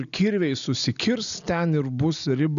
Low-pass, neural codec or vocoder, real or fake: 7.2 kHz; none; real